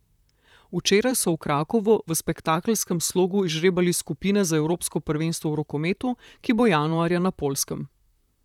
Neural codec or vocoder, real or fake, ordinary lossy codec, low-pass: vocoder, 44.1 kHz, 128 mel bands, Pupu-Vocoder; fake; none; 19.8 kHz